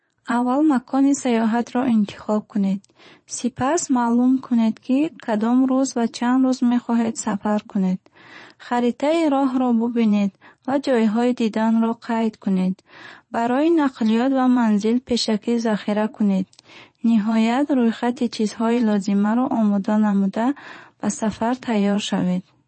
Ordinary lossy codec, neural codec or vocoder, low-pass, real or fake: MP3, 32 kbps; vocoder, 22.05 kHz, 80 mel bands, Vocos; 9.9 kHz; fake